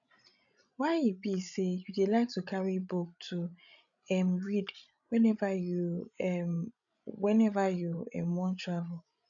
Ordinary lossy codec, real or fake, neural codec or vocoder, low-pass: none; fake; codec, 16 kHz, 16 kbps, FreqCodec, larger model; 7.2 kHz